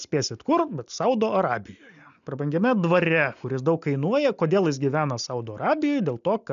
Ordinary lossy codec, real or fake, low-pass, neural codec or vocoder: MP3, 96 kbps; real; 7.2 kHz; none